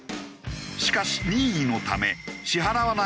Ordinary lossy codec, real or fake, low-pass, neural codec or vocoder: none; real; none; none